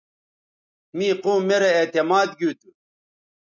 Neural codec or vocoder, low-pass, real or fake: none; 7.2 kHz; real